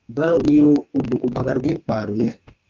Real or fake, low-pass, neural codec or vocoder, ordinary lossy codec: fake; 7.2 kHz; codec, 44.1 kHz, 2.6 kbps, DAC; Opus, 24 kbps